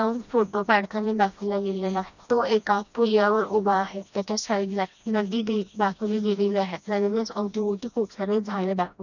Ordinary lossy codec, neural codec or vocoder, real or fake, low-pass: none; codec, 16 kHz, 1 kbps, FreqCodec, smaller model; fake; 7.2 kHz